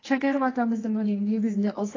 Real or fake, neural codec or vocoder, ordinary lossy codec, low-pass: fake; codec, 24 kHz, 0.9 kbps, WavTokenizer, medium music audio release; AAC, 32 kbps; 7.2 kHz